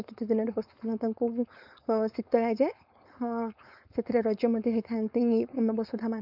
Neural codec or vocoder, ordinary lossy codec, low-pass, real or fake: codec, 16 kHz, 4.8 kbps, FACodec; none; 5.4 kHz; fake